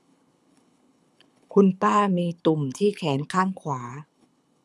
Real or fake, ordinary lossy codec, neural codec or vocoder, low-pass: fake; none; codec, 24 kHz, 6 kbps, HILCodec; none